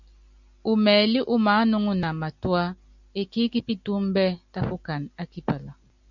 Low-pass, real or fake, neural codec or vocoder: 7.2 kHz; real; none